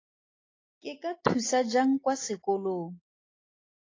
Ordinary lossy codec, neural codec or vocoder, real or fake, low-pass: AAC, 32 kbps; none; real; 7.2 kHz